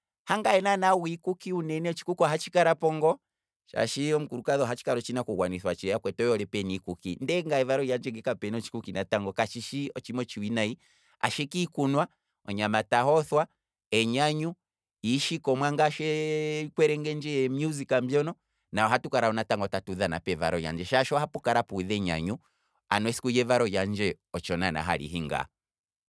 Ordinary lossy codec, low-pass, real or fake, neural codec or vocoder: none; none; real; none